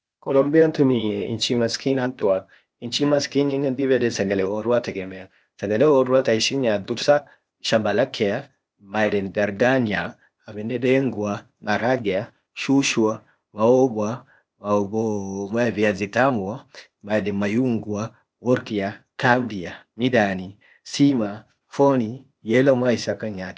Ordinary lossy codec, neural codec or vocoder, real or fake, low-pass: none; codec, 16 kHz, 0.8 kbps, ZipCodec; fake; none